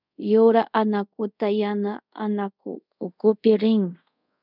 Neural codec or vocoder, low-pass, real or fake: codec, 24 kHz, 0.5 kbps, DualCodec; 5.4 kHz; fake